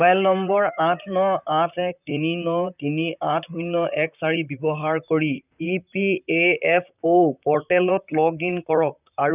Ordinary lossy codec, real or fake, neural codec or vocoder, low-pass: none; fake; vocoder, 44.1 kHz, 128 mel bands, Pupu-Vocoder; 3.6 kHz